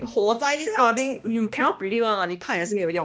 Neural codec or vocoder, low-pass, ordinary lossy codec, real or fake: codec, 16 kHz, 1 kbps, X-Codec, HuBERT features, trained on balanced general audio; none; none; fake